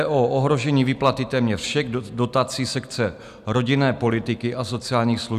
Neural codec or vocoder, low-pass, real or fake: none; 14.4 kHz; real